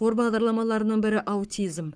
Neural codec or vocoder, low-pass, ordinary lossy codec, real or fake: codec, 44.1 kHz, 7.8 kbps, Pupu-Codec; 9.9 kHz; none; fake